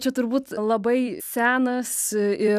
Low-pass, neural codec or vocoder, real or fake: 14.4 kHz; vocoder, 44.1 kHz, 128 mel bands every 256 samples, BigVGAN v2; fake